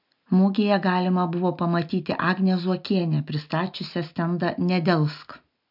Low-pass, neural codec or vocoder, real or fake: 5.4 kHz; none; real